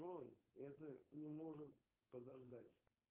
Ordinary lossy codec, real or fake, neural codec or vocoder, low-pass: Opus, 24 kbps; fake; codec, 16 kHz, 4.8 kbps, FACodec; 3.6 kHz